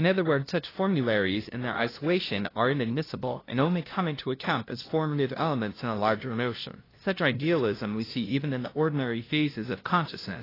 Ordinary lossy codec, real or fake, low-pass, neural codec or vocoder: AAC, 24 kbps; fake; 5.4 kHz; codec, 16 kHz, 0.5 kbps, FunCodec, trained on LibriTTS, 25 frames a second